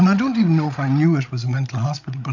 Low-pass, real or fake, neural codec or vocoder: 7.2 kHz; fake; codec, 16 kHz, 16 kbps, FreqCodec, larger model